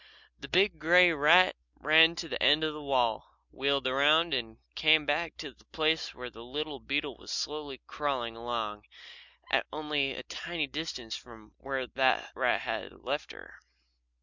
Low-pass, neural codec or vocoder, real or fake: 7.2 kHz; none; real